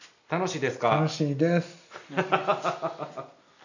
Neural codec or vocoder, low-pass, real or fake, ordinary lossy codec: none; 7.2 kHz; real; none